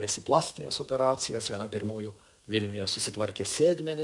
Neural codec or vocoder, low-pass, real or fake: codec, 32 kHz, 1.9 kbps, SNAC; 10.8 kHz; fake